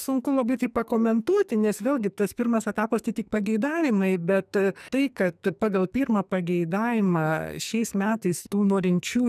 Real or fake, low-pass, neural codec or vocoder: fake; 14.4 kHz; codec, 44.1 kHz, 2.6 kbps, SNAC